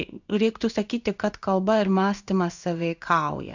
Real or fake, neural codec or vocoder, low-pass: fake; codec, 16 kHz in and 24 kHz out, 1 kbps, XY-Tokenizer; 7.2 kHz